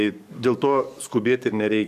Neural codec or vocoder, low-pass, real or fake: codec, 44.1 kHz, 7.8 kbps, DAC; 14.4 kHz; fake